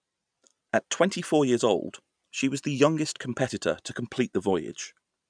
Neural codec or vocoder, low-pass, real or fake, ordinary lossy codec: none; 9.9 kHz; real; none